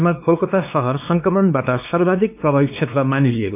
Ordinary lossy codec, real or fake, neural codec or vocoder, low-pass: none; fake; codec, 16 kHz, 2 kbps, X-Codec, WavLM features, trained on Multilingual LibriSpeech; 3.6 kHz